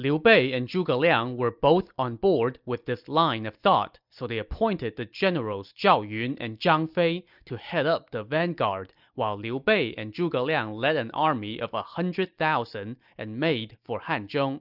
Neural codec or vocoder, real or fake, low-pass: none; real; 5.4 kHz